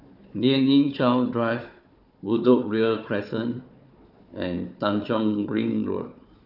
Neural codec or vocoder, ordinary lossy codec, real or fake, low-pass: codec, 16 kHz, 4 kbps, FunCodec, trained on Chinese and English, 50 frames a second; none; fake; 5.4 kHz